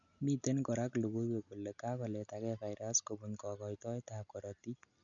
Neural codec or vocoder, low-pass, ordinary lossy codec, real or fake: none; 7.2 kHz; none; real